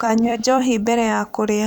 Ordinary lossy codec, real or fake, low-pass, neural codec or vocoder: none; fake; 19.8 kHz; vocoder, 44.1 kHz, 128 mel bands every 256 samples, BigVGAN v2